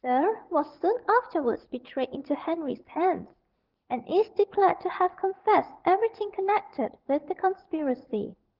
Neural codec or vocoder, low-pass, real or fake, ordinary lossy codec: vocoder, 44.1 kHz, 80 mel bands, Vocos; 5.4 kHz; fake; Opus, 16 kbps